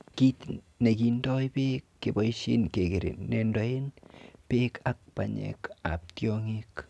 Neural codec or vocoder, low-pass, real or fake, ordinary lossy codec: none; none; real; none